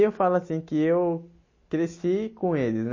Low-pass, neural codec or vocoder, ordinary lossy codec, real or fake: 7.2 kHz; none; MP3, 32 kbps; real